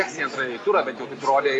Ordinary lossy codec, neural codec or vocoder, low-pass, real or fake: AAC, 32 kbps; none; 10.8 kHz; real